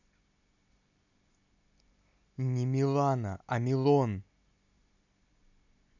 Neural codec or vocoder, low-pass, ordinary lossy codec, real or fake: none; 7.2 kHz; none; real